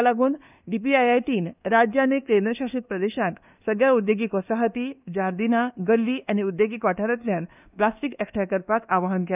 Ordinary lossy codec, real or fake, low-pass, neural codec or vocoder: none; fake; 3.6 kHz; codec, 24 kHz, 1.2 kbps, DualCodec